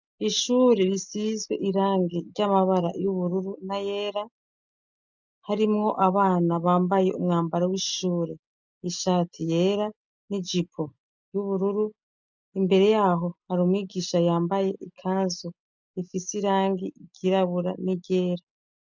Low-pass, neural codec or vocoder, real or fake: 7.2 kHz; none; real